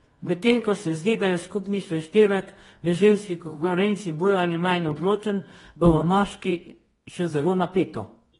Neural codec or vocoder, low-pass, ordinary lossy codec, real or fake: codec, 24 kHz, 0.9 kbps, WavTokenizer, medium music audio release; 10.8 kHz; AAC, 32 kbps; fake